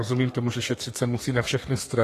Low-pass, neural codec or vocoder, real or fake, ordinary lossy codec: 14.4 kHz; codec, 32 kHz, 1.9 kbps, SNAC; fake; AAC, 48 kbps